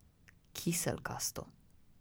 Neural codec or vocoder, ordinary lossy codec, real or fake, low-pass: none; none; real; none